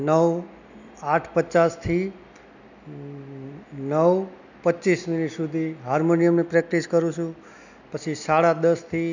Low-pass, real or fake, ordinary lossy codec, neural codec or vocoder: 7.2 kHz; real; none; none